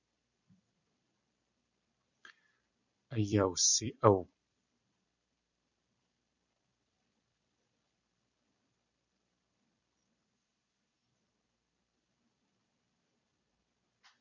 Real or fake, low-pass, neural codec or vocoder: fake; 7.2 kHz; vocoder, 44.1 kHz, 128 mel bands every 256 samples, BigVGAN v2